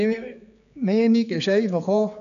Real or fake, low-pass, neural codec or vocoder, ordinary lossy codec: fake; 7.2 kHz; codec, 16 kHz, 4 kbps, X-Codec, HuBERT features, trained on general audio; none